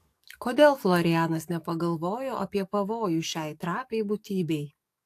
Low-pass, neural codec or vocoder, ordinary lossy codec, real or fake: 14.4 kHz; codec, 44.1 kHz, 7.8 kbps, DAC; AAC, 64 kbps; fake